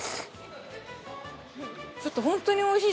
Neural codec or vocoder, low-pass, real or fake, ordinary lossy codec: none; none; real; none